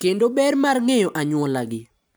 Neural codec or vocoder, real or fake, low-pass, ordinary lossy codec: none; real; none; none